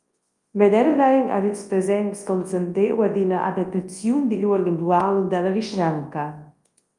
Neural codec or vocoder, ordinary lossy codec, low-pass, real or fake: codec, 24 kHz, 0.9 kbps, WavTokenizer, large speech release; Opus, 32 kbps; 10.8 kHz; fake